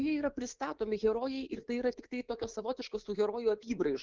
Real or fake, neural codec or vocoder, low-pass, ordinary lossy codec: fake; codec, 24 kHz, 3.1 kbps, DualCodec; 7.2 kHz; Opus, 16 kbps